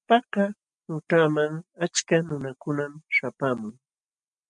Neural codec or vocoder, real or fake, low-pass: none; real; 10.8 kHz